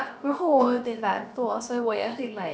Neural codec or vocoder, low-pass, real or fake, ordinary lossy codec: codec, 16 kHz, about 1 kbps, DyCAST, with the encoder's durations; none; fake; none